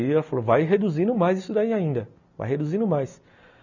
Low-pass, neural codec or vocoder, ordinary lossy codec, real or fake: 7.2 kHz; none; none; real